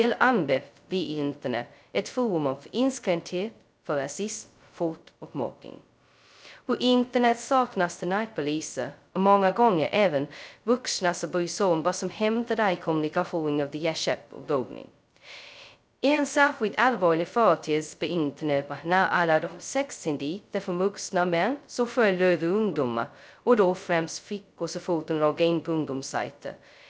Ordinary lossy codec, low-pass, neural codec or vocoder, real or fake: none; none; codec, 16 kHz, 0.2 kbps, FocalCodec; fake